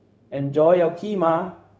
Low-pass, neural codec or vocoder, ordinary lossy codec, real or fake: none; codec, 16 kHz, 0.4 kbps, LongCat-Audio-Codec; none; fake